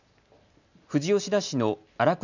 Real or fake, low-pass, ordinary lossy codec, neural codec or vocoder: real; 7.2 kHz; none; none